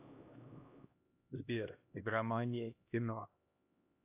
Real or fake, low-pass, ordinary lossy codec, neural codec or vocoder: fake; 3.6 kHz; AAC, 32 kbps; codec, 16 kHz, 1 kbps, X-Codec, HuBERT features, trained on LibriSpeech